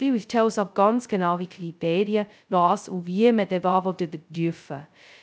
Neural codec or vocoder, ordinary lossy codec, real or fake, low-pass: codec, 16 kHz, 0.2 kbps, FocalCodec; none; fake; none